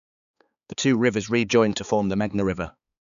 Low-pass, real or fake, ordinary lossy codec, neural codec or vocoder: 7.2 kHz; fake; none; codec, 16 kHz, 4 kbps, X-Codec, HuBERT features, trained on balanced general audio